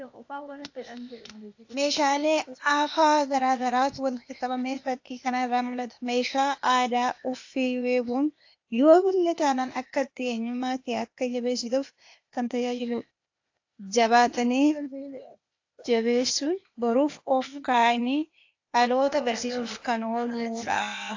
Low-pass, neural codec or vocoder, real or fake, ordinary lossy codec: 7.2 kHz; codec, 16 kHz, 0.8 kbps, ZipCodec; fake; AAC, 48 kbps